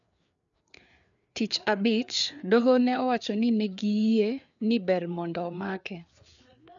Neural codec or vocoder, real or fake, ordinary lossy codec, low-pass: codec, 16 kHz, 4 kbps, FreqCodec, larger model; fake; none; 7.2 kHz